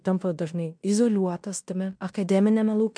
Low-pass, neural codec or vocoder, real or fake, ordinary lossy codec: 9.9 kHz; codec, 16 kHz in and 24 kHz out, 0.9 kbps, LongCat-Audio-Codec, fine tuned four codebook decoder; fake; MP3, 64 kbps